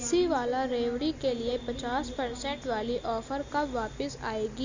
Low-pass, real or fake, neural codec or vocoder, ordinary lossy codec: 7.2 kHz; real; none; Opus, 64 kbps